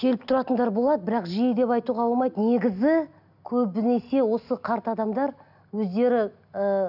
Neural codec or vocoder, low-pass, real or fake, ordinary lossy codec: none; 5.4 kHz; real; none